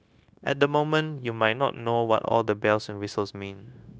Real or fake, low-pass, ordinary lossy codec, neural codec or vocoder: fake; none; none; codec, 16 kHz, 0.9 kbps, LongCat-Audio-Codec